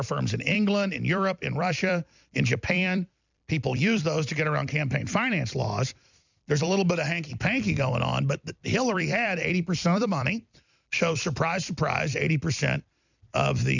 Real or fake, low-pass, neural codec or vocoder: fake; 7.2 kHz; vocoder, 44.1 kHz, 128 mel bands every 256 samples, BigVGAN v2